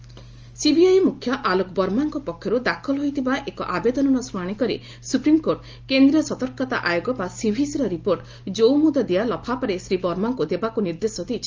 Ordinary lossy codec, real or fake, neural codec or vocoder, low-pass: Opus, 24 kbps; real; none; 7.2 kHz